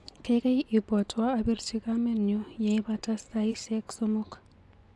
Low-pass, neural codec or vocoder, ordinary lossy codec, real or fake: none; vocoder, 24 kHz, 100 mel bands, Vocos; none; fake